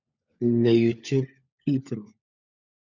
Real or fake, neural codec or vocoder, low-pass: fake; codec, 16 kHz, 16 kbps, FunCodec, trained on LibriTTS, 50 frames a second; 7.2 kHz